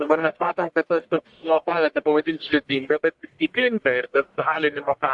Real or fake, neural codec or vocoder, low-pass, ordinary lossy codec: fake; codec, 44.1 kHz, 1.7 kbps, Pupu-Codec; 10.8 kHz; AAC, 64 kbps